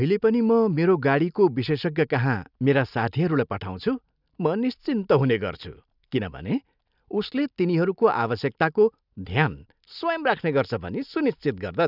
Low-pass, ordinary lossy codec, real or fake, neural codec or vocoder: 5.4 kHz; none; real; none